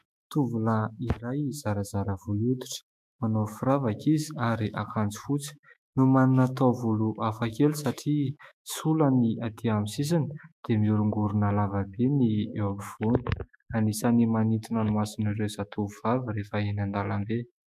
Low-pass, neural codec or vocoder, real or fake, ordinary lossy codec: 14.4 kHz; autoencoder, 48 kHz, 128 numbers a frame, DAC-VAE, trained on Japanese speech; fake; MP3, 96 kbps